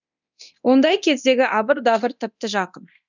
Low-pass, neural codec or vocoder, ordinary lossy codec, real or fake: 7.2 kHz; codec, 24 kHz, 0.9 kbps, DualCodec; none; fake